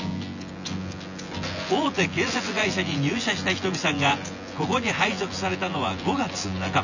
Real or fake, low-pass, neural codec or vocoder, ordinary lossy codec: fake; 7.2 kHz; vocoder, 24 kHz, 100 mel bands, Vocos; none